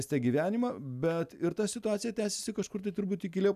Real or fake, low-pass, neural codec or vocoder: real; 14.4 kHz; none